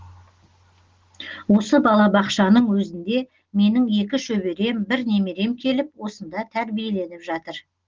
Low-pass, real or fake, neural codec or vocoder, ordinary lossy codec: 7.2 kHz; real; none; Opus, 16 kbps